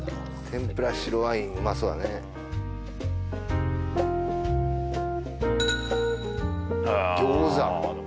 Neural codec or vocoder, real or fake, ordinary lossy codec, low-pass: none; real; none; none